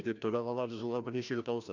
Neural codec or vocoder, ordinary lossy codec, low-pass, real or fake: codec, 16 kHz, 1 kbps, FreqCodec, larger model; none; 7.2 kHz; fake